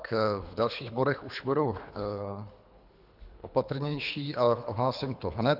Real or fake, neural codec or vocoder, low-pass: fake; codec, 24 kHz, 3 kbps, HILCodec; 5.4 kHz